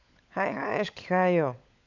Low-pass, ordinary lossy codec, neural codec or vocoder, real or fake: 7.2 kHz; none; codec, 16 kHz, 16 kbps, FunCodec, trained on LibriTTS, 50 frames a second; fake